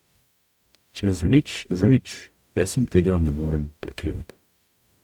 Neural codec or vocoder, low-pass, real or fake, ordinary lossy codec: codec, 44.1 kHz, 0.9 kbps, DAC; 19.8 kHz; fake; none